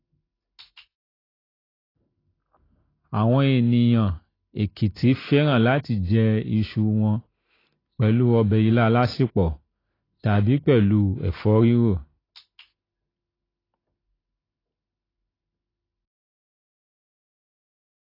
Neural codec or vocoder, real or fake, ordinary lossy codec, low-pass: none; real; AAC, 24 kbps; 5.4 kHz